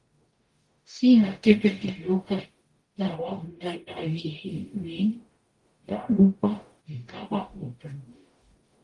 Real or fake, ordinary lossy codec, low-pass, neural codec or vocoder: fake; Opus, 24 kbps; 10.8 kHz; codec, 44.1 kHz, 0.9 kbps, DAC